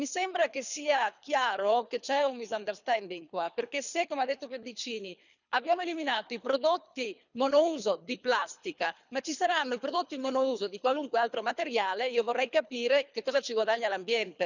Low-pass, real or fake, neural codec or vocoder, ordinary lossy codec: 7.2 kHz; fake; codec, 24 kHz, 3 kbps, HILCodec; none